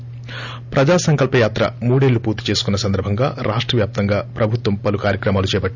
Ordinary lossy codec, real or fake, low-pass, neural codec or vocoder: none; real; 7.2 kHz; none